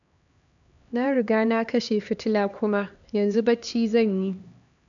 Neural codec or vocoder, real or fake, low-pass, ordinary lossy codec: codec, 16 kHz, 2 kbps, X-Codec, HuBERT features, trained on LibriSpeech; fake; 7.2 kHz; none